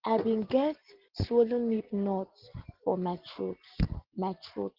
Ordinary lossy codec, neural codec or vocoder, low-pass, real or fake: Opus, 16 kbps; none; 5.4 kHz; real